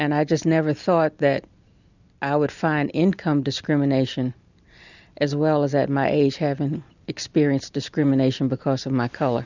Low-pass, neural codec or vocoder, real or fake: 7.2 kHz; none; real